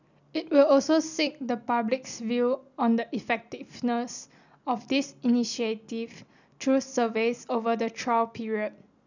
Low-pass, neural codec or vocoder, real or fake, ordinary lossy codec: 7.2 kHz; none; real; none